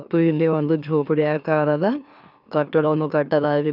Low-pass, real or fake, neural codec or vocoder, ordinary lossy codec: 5.4 kHz; fake; autoencoder, 44.1 kHz, a latent of 192 numbers a frame, MeloTTS; none